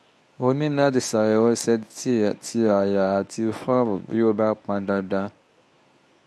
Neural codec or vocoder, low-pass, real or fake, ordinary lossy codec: codec, 24 kHz, 0.9 kbps, WavTokenizer, medium speech release version 1; none; fake; none